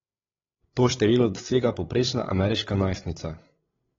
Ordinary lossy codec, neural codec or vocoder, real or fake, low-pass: AAC, 24 kbps; codec, 16 kHz, 8 kbps, FreqCodec, larger model; fake; 7.2 kHz